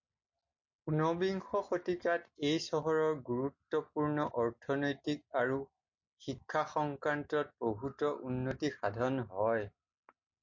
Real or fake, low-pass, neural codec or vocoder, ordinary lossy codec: real; 7.2 kHz; none; MP3, 48 kbps